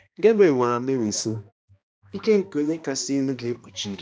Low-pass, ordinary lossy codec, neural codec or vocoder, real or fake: none; none; codec, 16 kHz, 1 kbps, X-Codec, HuBERT features, trained on balanced general audio; fake